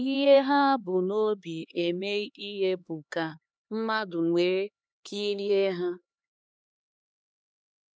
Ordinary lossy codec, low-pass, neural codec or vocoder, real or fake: none; none; codec, 16 kHz, 2 kbps, X-Codec, HuBERT features, trained on LibriSpeech; fake